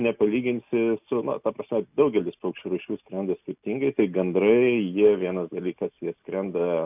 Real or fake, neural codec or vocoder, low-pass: real; none; 3.6 kHz